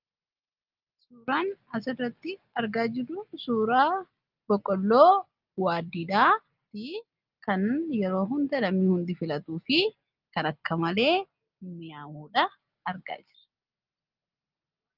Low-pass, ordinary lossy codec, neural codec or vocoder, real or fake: 5.4 kHz; Opus, 24 kbps; none; real